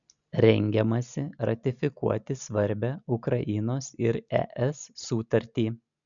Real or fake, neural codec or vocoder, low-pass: real; none; 7.2 kHz